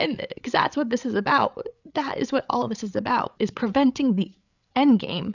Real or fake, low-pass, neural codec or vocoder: fake; 7.2 kHz; codec, 16 kHz, 8 kbps, FreqCodec, larger model